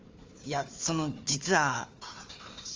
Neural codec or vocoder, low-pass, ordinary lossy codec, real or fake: codec, 16 kHz, 4 kbps, FunCodec, trained on Chinese and English, 50 frames a second; 7.2 kHz; Opus, 32 kbps; fake